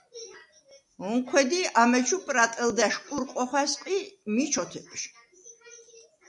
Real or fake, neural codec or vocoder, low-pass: real; none; 10.8 kHz